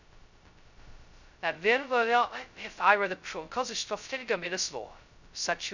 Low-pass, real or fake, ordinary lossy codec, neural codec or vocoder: 7.2 kHz; fake; none; codec, 16 kHz, 0.2 kbps, FocalCodec